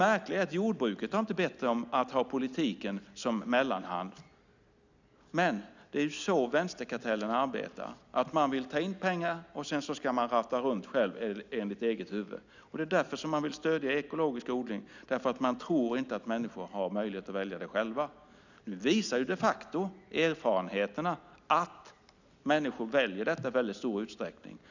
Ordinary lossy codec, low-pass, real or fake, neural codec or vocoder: none; 7.2 kHz; real; none